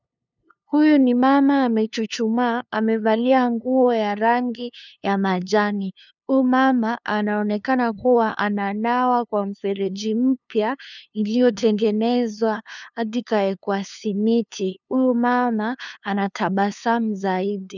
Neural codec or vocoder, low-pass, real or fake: codec, 16 kHz, 2 kbps, FunCodec, trained on LibriTTS, 25 frames a second; 7.2 kHz; fake